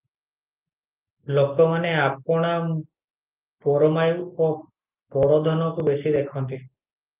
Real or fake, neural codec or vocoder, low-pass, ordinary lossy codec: real; none; 3.6 kHz; Opus, 24 kbps